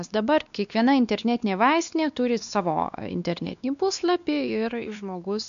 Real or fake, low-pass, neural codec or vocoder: fake; 7.2 kHz; codec, 16 kHz, 2 kbps, X-Codec, WavLM features, trained on Multilingual LibriSpeech